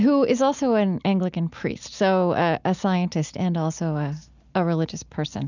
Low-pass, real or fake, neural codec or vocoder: 7.2 kHz; real; none